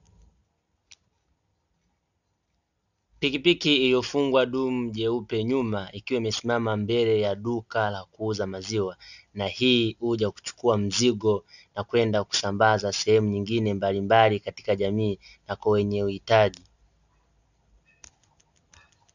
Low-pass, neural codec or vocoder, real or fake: 7.2 kHz; none; real